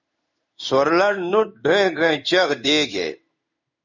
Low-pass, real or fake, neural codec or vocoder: 7.2 kHz; fake; codec, 16 kHz in and 24 kHz out, 1 kbps, XY-Tokenizer